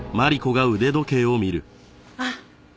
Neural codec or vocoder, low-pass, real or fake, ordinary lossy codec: none; none; real; none